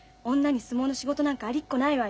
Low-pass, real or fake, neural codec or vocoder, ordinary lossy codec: none; real; none; none